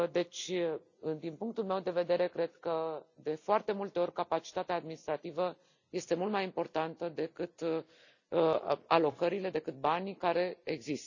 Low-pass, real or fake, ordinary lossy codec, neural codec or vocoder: 7.2 kHz; real; none; none